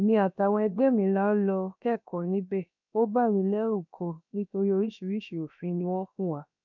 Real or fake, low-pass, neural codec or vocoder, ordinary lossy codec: fake; 7.2 kHz; codec, 16 kHz, about 1 kbps, DyCAST, with the encoder's durations; none